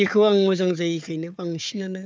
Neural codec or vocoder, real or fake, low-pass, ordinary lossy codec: codec, 16 kHz, 4 kbps, FunCodec, trained on Chinese and English, 50 frames a second; fake; none; none